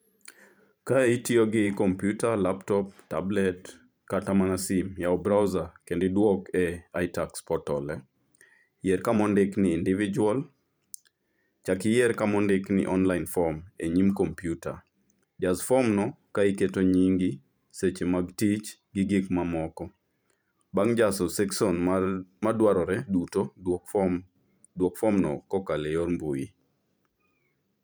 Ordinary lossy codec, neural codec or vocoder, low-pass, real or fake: none; vocoder, 44.1 kHz, 128 mel bands every 512 samples, BigVGAN v2; none; fake